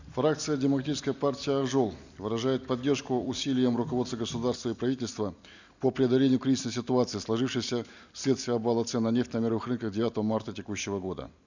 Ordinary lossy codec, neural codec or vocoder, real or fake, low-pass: none; none; real; 7.2 kHz